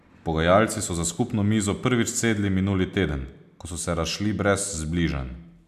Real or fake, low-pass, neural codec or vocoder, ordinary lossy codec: real; 14.4 kHz; none; none